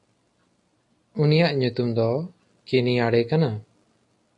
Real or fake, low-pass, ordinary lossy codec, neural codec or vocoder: real; 10.8 kHz; MP3, 48 kbps; none